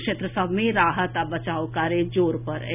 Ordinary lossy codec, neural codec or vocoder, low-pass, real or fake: none; none; 3.6 kHz; real